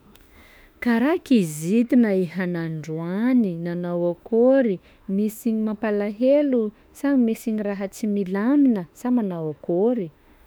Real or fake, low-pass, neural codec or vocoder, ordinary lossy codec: fake; none; autoencoder, 48 kHz, 32 numbers a frame, DAC-VAE, trained on Japanese speech; none